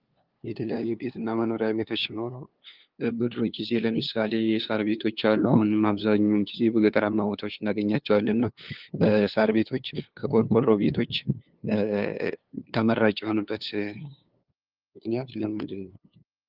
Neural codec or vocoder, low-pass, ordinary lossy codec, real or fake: codec, 16 kHz, 2 kbps, FunCodec, trained on LibriTTS, 25 frames a second; 5.4 kHz; Opus, 32 kbps; fake